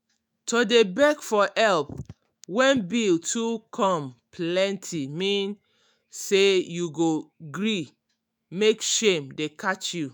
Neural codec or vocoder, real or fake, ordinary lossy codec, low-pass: autoencoder, 48 kHz, 128 numbers a frame, DAC-VAE, trained on Japanese speech; fake; none; none